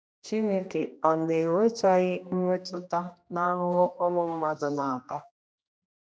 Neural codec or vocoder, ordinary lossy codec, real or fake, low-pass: codec, 16 kHz, 1 kbps, X-Codec, HuBERT features, trained on general audio; none; fake; none